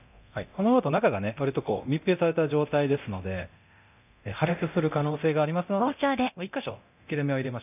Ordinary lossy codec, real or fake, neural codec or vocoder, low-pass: none; fake; codec, 24 kHz, 0.9 kbps, DualCodec; 3.6 kHz